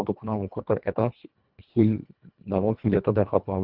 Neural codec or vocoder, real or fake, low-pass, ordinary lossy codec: codec, 24 kHz, 1.5 kbps, HILCodec; fake; 5.4 kHz; Opus, 24 kbps